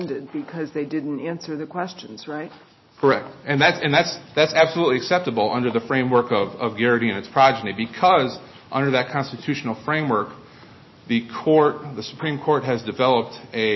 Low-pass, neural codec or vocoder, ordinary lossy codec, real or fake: 7.2 kHz; none; MP3, 24 kbps; real